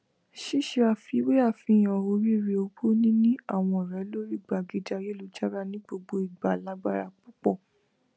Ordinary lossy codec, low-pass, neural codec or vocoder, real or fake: none; none; none; real